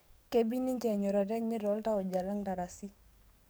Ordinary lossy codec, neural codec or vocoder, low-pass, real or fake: none; codec, 44.1 kHz, 7.8 kbps, DAC; none; fake